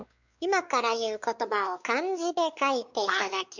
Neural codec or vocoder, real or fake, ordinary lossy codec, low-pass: codec, 16 kHz, 4 kbps, X-Codec, WavLM features, trained on Multilingual LibriSpeech; fake; none; 7.2 kHz